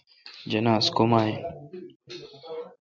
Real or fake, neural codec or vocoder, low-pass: real; none; 7.2 kHz